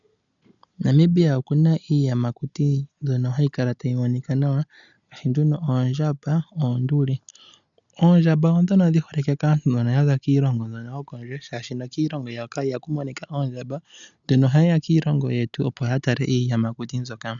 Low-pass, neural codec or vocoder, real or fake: 7.2 kHz; none; real